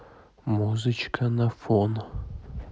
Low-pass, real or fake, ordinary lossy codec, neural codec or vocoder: none; real; none; none